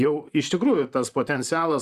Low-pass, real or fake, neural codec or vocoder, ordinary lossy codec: 14.4 kHz; fake; vocoder, 44.1 kHz, 128 mel bands, Pupu-Vocoder; Opus, 64 kbps